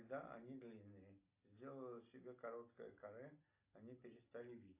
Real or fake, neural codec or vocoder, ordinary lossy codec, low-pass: real; none; AAC, 32 kbps; 3.6 kHz